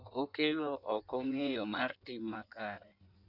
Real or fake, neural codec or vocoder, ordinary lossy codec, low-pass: fake; codec, 16 kHz in and 24 kHz out, 1.1 kbps, FireRedTTS-2 codec; none; 5.4 kHz